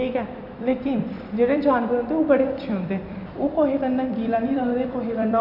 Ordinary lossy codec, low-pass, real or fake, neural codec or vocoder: AAC, 48 kbps; 5.4 kHz; fake; vocoder, 44.1 kHz, 128 mel bands every 256 samples, BigVGAN v2